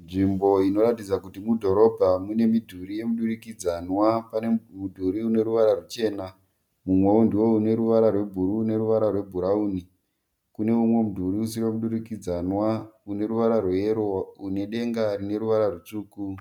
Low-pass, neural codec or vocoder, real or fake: 19.8 kHz; none; real